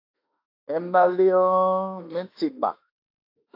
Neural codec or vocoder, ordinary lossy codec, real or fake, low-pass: autoencoder, 48 kHz, 32 numbers a frame, DAC-VAE, trained on Japanese speech; AAC, 32 kbps; fake; 5.4 kHz